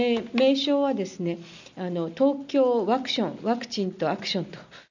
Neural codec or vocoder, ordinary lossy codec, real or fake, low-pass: none; none; real; 7.2 kHz